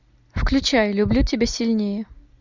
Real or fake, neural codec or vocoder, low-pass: real; none; 7.2 kHz